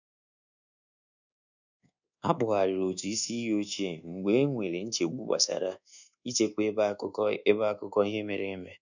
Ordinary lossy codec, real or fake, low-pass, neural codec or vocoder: none; fake; 7.2 kHz; codec, 24 kHz, 1.2 kbps, DualCodec